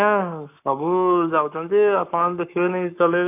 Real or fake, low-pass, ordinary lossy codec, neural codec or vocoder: fake; 3.6 kHz; AAC, 32 kbps; codec, 44.1 kHz, 7.8 kbps, Pupu-Codec